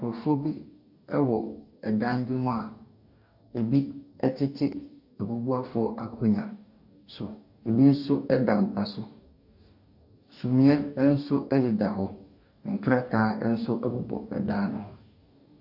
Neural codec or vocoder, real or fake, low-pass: codec, 44.1 kHz, 2.6 kbps, DAC; fake; 5.4 kHz